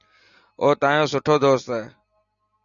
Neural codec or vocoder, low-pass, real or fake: none; 7.2 kHz; real